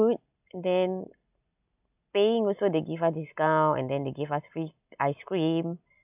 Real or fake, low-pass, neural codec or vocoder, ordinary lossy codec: real; 3.6 kHz; none; none